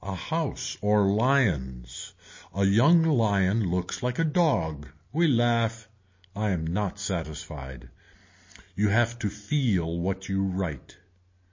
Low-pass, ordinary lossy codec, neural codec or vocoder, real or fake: 7.2 kHz; MP3, 32 kbps; none; real